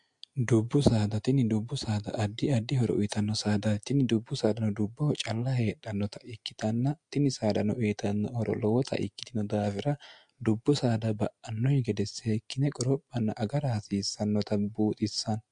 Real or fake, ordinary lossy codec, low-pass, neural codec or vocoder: real; MP3, 64 kbps; 9.9 kHz; none